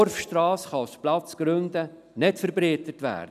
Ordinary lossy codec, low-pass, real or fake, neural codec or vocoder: none; 14.4 kHz; real; none